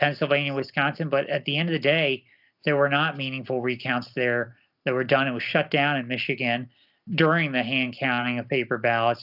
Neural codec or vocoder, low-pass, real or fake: none; 5.4 kHz; real